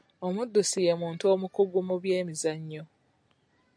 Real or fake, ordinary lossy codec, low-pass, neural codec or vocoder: real; AAC, 64 kbps; 9.9 kHz; none